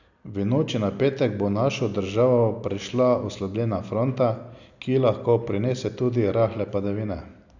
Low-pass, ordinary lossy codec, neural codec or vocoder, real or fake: 7.2 kHz; none; none; real